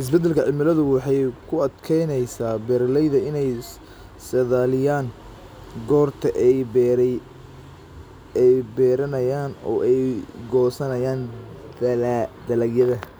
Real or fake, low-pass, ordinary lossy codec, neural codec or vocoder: real; none; none; none